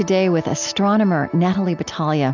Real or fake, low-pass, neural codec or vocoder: real; 7.2 kHz; none